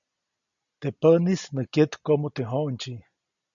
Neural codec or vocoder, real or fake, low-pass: none; real; 7.2 kHz